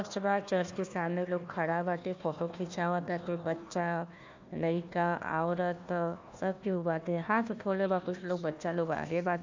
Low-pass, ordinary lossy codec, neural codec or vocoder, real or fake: 7.2 kHz; MP3, 64 kbps; codec, 16 kHz, 1 kbps, FunCodec, trained on Chinese and English, 50 frames a second; fake